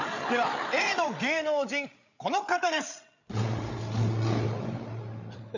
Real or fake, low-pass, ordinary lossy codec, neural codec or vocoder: fake; 7.2 kHz; none; codec, 16 kHz, 8 kbps, FreqCodec, larger model